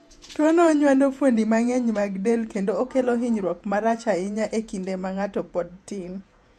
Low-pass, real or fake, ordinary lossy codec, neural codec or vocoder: 14.4 kHz; fake; MP3, 64 kbps; vocoder, 48 kHz, 128 mel bands, Vocos